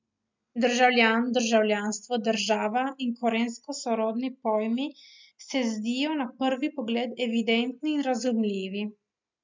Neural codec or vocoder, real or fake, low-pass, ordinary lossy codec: none; real; 7.2 kHz; MP3, 64 kbps